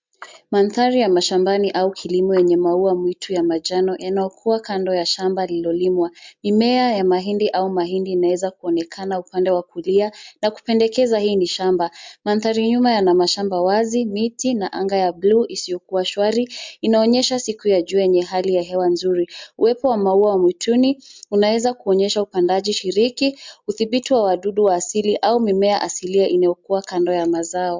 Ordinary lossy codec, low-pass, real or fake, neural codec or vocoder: MP3, 64 kbps; 7.2 kHz; real; none